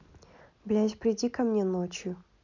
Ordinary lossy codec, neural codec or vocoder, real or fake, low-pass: none; none; real; 7.2 kHz